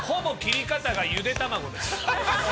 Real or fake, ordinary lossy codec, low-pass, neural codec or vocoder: real; none; none; none